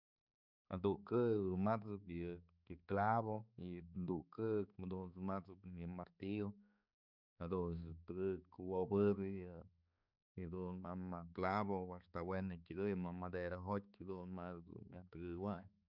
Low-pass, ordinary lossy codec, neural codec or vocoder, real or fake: 5.4 kHz; none; codec, 16 kHz, 4 kbps, X-Codec, HuBERT features, trained on balanced general audio; fake